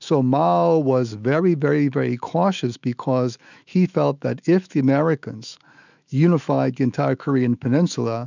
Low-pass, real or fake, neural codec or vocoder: 7.2 kHz; fake; autoencoder, 48 kHz, 128 numbers a frame, DAC-VAE, trained on Japanese speech